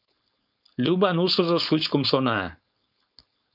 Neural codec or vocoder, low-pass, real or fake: codec, 16 kHz, 4.8 kbps, FACodec; 5.4 kHz; fake